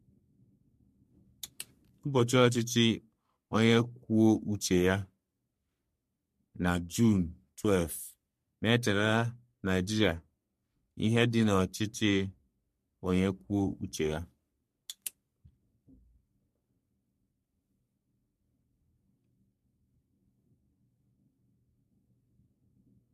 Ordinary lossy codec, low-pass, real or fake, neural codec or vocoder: MP3, 64 kbps; 14.4 kHz; fake; codec, 44.1 kHz, 3.4 kbps, Pupu-Codec